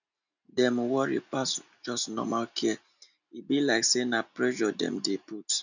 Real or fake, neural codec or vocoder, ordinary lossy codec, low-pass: real; none; none; 7.2 kHz